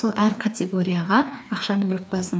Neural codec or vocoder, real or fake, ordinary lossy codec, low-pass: codec, 16 kHz, 2 kbps, FreqCodec, larger model; fake; none; none